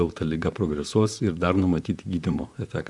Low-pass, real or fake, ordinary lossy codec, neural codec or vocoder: 10.8 kHz; fake; MP3, 96 kbps; vocoder, 44.1 kHz, 128 mel bands, Pupu-Vocoder